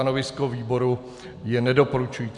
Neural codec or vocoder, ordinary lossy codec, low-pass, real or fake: none; MP3, 96 kbps; 10.8 kHz; real